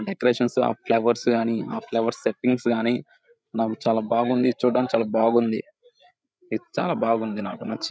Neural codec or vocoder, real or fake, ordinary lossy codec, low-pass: codec, 16 kHz, 8 kbps, FreqCodec, larger model; fake; none; none